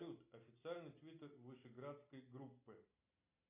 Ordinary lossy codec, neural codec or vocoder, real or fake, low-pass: AAC, 32 kbps; none; real; 3.6 kHz